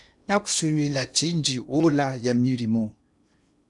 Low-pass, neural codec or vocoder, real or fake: 10.8 kHz; codec, 16 kHz in and 24 kHz out, 0.8 kbps, FocalCodec, streaming, 65536 codes; fake